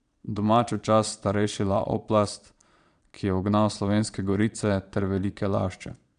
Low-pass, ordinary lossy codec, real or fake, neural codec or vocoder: 9.9 kHz; none; fake; vocoder, 22.05 kHz, 80 mel bands, Vocos